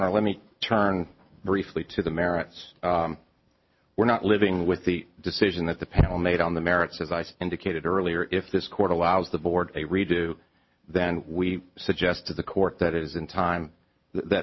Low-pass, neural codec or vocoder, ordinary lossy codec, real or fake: 7.2 kHz; none; MP3, 24 kbps; real